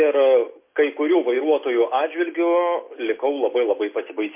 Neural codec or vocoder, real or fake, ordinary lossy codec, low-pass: none; real; MP3, 24 kbps; 3.6 kHz